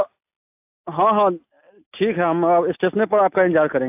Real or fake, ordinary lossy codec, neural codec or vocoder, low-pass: real; AAC, 32 kbps; none; 3.6 kHz